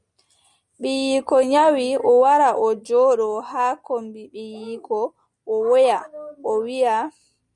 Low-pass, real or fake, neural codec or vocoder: 10.8 kHz; real; none